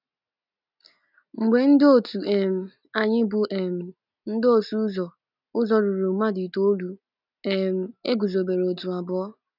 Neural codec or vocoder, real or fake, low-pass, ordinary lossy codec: none; real; 5.4 kHz; none